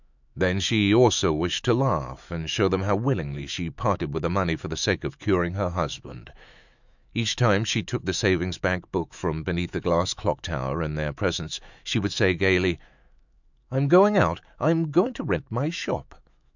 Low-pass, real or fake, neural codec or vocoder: 7.2 kHz; fake; autoencoder, 48 kHz, 128 numbers a frame, DAC-VAE, trained on Japanese speech